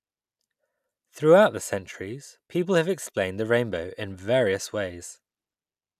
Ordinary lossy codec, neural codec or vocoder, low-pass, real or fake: none; none; 14.4 kHz; real